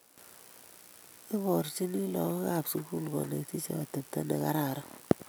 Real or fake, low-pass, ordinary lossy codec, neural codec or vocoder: real; none; none; none